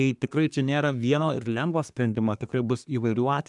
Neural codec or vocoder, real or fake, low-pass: codec, 24 kHz, 1 kbps, SNAC; fake; 10.8 kHz